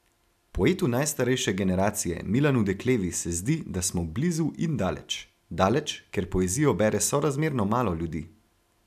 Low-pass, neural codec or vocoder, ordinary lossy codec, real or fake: 14.4 kHz; none; none; real